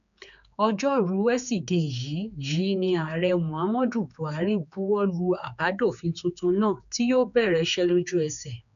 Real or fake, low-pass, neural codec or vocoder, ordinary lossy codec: fake; 7.2 kHz; codec, 16 kHz, 4 kbps, X-Codec, HuBERT features, trained on general audio; none